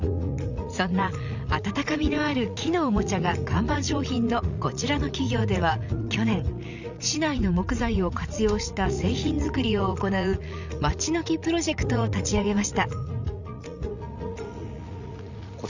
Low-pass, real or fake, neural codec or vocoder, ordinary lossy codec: 7.2 kHz; fake; vocoder, 44.1 kHz, 80 mel bands, Vocos; none